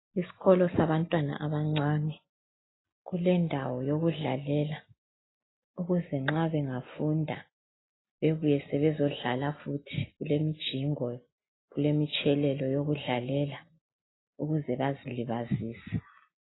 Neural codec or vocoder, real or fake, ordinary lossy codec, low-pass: none; real; AAC, 16 kbps; 7.2 kHz